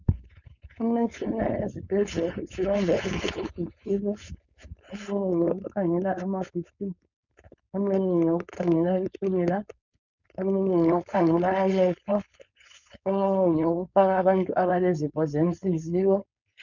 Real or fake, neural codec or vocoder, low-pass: fake; codec, 16 kHz, 4.8 kbps, FACodec; 7.2 kHz